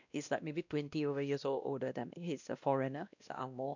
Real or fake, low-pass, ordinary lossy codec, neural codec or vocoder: fake; 7.2 kHz; none; codec, 16 kHz, 1 kbps, X-Codec, WavLM features, trained on Multilingual LibriSpeech